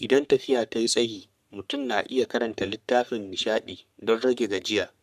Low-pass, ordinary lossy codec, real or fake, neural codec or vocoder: 14.4 kHz; none; fake; codec, 44.1 kHz, 3.4 kbps, Pupu-Codec